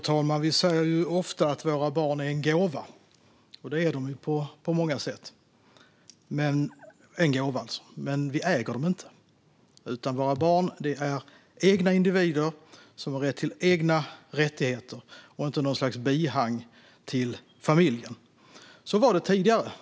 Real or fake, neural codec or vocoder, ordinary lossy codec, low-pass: real; none; none; none